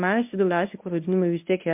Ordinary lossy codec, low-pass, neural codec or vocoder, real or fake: MP3, 32 kbps; 3.6 kHz; codec, 24 kHz, 0.9 kbps, WavTokenizer, medium speech release version 1; fake